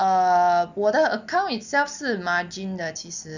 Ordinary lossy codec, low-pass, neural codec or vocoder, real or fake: none; 7.2 kHz; none; real